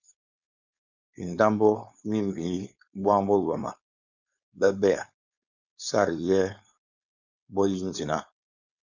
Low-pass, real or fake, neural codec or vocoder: 7.2 kHz; fake; codec, 16 kHz, 4.8 kbps, FACodec